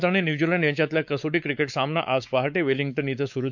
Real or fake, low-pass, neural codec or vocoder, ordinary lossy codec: fake; 7.2 kHz; codec, 16 kHz, 4 kbps, X-Codec, WavLM features, trained on Multilingual LibriSpeech; none